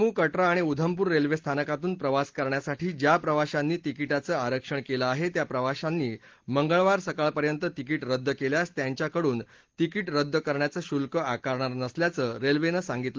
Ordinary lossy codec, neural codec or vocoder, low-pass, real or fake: Opus, 16 kbps; none; 7.2 kHz; real